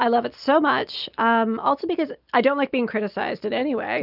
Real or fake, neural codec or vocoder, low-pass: real; none; 5.4 kHz